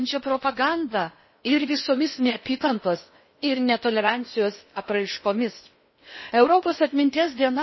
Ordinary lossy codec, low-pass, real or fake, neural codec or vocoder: MP3, 24 kbps; 7.2 kHz; fake; codec, 16 kHz in and 24 kHz out, 0.8 kbps, FocalCodec, streaming, 65536 codes